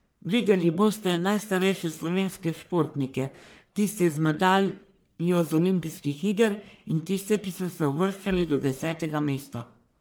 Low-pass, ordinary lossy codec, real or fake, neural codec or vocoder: none; none; fake; codec, 44.1 kHz, 1.7 kbps, Pupu-Codec